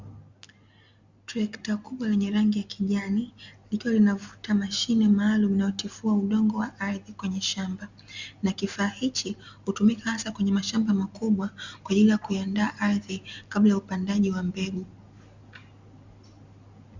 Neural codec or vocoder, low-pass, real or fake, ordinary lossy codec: none; 7.2 kHz; real; Opus, 64 kbps